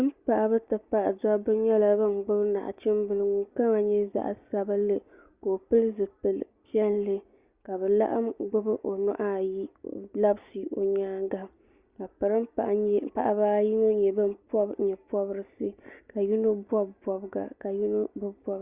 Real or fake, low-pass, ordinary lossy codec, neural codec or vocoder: real; 3.6 kHz; Opus, 64 kbps; none